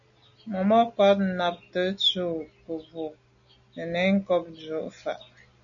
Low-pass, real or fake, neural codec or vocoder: 7.2 kHz; real; none